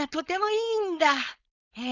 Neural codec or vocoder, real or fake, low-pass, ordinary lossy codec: codec, 16 kHz, 4.8 kbps, FACodec; fake; 7.2 kHz; none